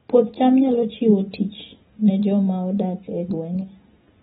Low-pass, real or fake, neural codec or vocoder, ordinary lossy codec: 19.8 kHz; real; none; AAC, 16 kbps